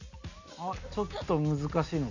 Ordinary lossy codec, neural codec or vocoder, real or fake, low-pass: Opus, 64 kbps; none; real; 7.2 kHz